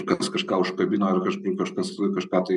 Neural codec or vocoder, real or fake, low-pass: none; real; 10.8 kHz